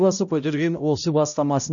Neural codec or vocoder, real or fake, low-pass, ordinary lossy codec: codec, 16 kHz, 0.5 kbps, X-Codec, HuBERT features, trained on balanced general audio; fake; 7.2 kHz; none